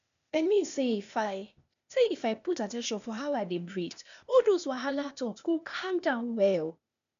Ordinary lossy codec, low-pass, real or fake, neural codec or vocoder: none; 7.2 kHz; fake; codec, 16 kHz, 0.8 kbps, ZipCodec